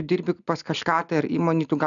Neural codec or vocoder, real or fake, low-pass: none; real; 7.2 kHz